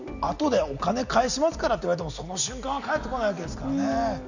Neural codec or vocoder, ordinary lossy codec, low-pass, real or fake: none; none; 7.2 kHz; real